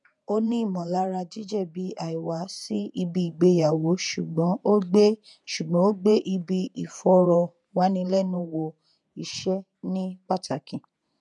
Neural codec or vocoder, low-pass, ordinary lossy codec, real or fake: vocoder, 48 kHz, 128 mel bands, Vocos; 10.8 kHz; none; fake